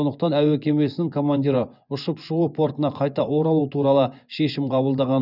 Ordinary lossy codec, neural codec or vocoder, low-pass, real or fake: none; vocoder, 24 kHz, 100 mel bands, Vocos; 5.4 kHz; fake